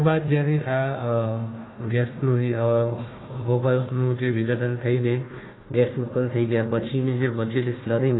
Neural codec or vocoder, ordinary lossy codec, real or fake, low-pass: codec, 16 kHz, 1 kbps, FunCodec, trained on Chinese and English, 50 frames a second; AAC, 16 kbps; fake; 7.2 kHz